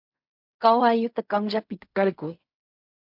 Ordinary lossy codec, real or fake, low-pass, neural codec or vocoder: MP3, 48 kbps; fake; 5.4 kHz; codec, 16 kHz in and 24 kHz out, 0.4 kbps, LongCat-Audio-Codec, fine tuned four codebook decoder